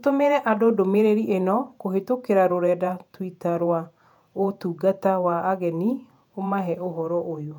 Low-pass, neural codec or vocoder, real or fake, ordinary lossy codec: 19.8 kHz; vocoder, 48 kHz, 128 mel bands, Vocos; fake; none